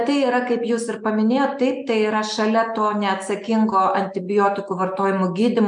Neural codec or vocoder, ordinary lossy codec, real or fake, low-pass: none; MP3, 64 kbps; real; 9.9 kHz